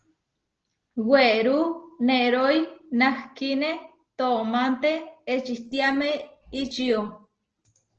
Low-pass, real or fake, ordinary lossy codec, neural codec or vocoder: 7.2 kHz; real; Opus, 16 kbps; none